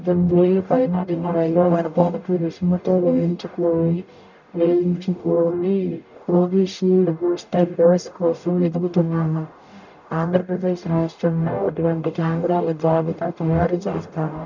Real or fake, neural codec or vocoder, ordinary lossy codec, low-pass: fake; codec, 44.1 kHz, 0.9 kbps, DAC; none; 7.2 kHz